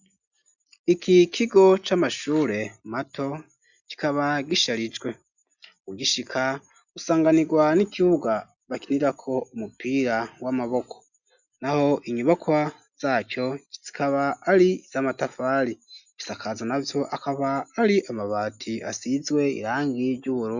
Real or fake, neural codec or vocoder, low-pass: real; none; 7.2 kHz